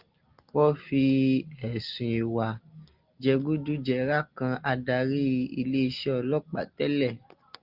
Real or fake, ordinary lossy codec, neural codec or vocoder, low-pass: real; Opus, 32 kbps; none; 5.4 kHz